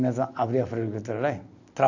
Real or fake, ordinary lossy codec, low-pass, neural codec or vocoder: real; AAC, 48 kbps; 7.2 kHz; none